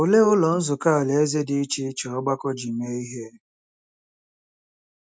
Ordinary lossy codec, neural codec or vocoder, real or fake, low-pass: none; none; real; none